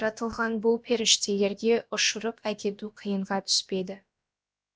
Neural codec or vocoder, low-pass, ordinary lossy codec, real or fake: codec, 16 kHz, about 1 kbps, DyCAST, with the encoder's durations; none; none; fake